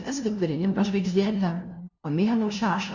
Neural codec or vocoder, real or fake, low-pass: codec, 16 kHz, 0.5 kbps, FunCodec, trained on LibriTTS, 25 frames a second; fake; 7.2 kHz